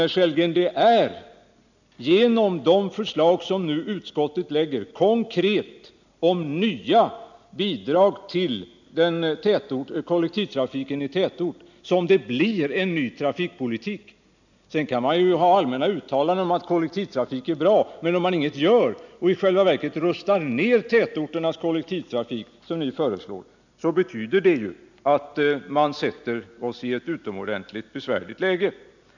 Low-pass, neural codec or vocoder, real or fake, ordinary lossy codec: 7.2 kHz; none; real; none